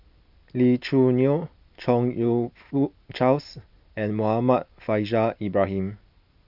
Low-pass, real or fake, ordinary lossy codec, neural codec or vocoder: 5.4 kHz; real; none; none